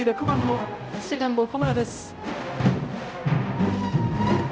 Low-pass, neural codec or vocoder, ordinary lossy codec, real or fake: none; codec, 16 kHz, 0.5 kbps, X-Codec, HuBERT features, trained on balanced general audio; none; fake